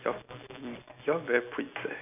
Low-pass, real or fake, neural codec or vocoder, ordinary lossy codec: 3.6 kHz; real; none; none